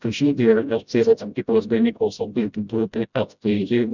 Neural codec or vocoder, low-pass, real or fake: codec, 16 kHz, 0.5 kbps, FreqCodec, smaller model; 7.2 kHz; fake